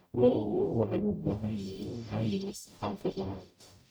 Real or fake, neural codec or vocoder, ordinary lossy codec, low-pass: fake; codec, 44.1 kHz, 0.9 kbps, DAC; none; none